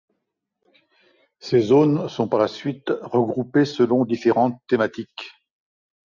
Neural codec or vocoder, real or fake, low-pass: none; real; 7.2 kHz